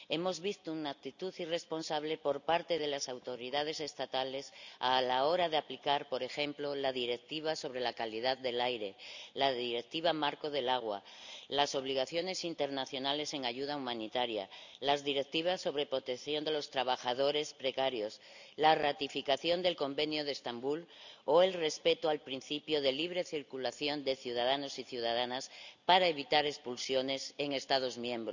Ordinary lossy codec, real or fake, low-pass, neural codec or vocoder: none; real; 7.2 kHz; none